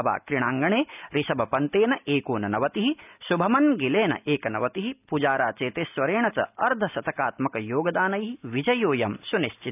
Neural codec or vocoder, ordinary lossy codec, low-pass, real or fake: none; none; 3.6 kHz; real